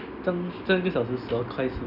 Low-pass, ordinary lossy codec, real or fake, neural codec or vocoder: 5.4 kHz; none; real; none